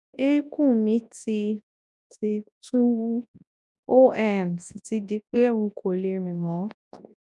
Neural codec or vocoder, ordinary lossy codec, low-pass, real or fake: codec, 24 kHz, 0.9 kbps, WavTokenizer, large speech release; none; 10.8 kHz; fake